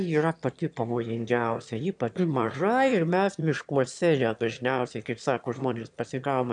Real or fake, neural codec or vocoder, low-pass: fake; autoencoder, 22.05 kHz, a latent of 192 numbers a frame, VITS, trained on one speaker; 9.9 kHz